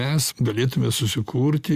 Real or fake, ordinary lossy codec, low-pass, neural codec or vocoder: real; Opus, 64 kbps; 14.4 kHz; none